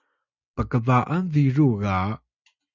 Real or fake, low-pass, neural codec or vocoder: real; 7.2 kHz; none